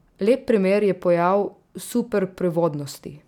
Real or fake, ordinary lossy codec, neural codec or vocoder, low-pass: real; none; none; 19.8 kHz